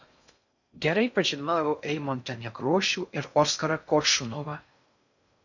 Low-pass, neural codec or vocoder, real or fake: 7.2 kHz; codec, 16 kHz in and 24 kHz out, 0.6 kbps, FocalCodec, streaming, 4096 codes; fake